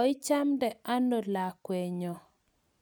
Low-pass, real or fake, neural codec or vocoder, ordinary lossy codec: none; real; none; none